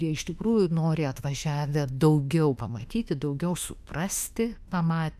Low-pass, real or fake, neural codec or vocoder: 14.4 kHz; fake; autoencoder, 48 kHz, 32 numbers a frame, DAC-VAE, trained on Japanese speech